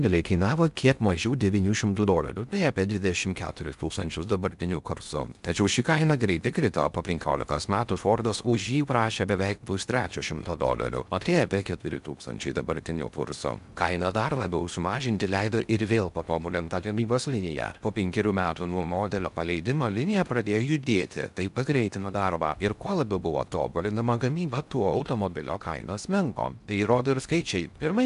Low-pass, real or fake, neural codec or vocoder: 10.8 kHz; fake; codec, 16 kHz in and 24 kHz out, 0.6 kbps, FocalCodec, streaming, 2048 codes